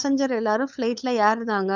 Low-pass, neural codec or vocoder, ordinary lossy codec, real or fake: 7.2 kHz; codec, 16 kHz, 8 kbps, FunCodec, trained on LibriTTS, 25 frames a second; none; fake